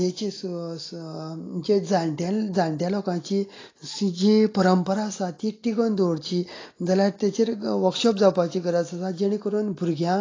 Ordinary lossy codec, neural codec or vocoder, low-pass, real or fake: AAC, 32 kbps; none; 7.2 kHz; real